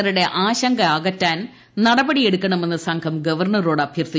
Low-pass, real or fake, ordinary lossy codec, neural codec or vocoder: none; real; none; none